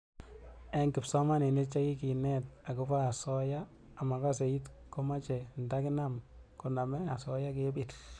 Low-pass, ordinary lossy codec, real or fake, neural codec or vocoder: 9.9 kHz; none; real; none